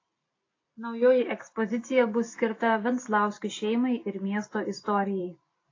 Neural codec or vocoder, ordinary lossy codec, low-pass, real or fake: none; AAC, 32 kbps; 7.2 kHz; real